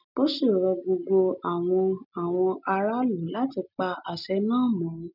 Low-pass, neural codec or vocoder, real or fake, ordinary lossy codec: 5.4 kHz; none; real; none